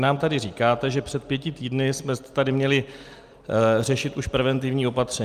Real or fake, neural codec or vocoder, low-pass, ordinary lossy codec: real; none; 14.4 kHz; Opus, 32 kbps